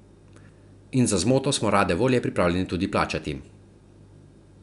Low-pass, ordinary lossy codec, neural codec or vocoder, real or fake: 10.8 kHz; none; none; real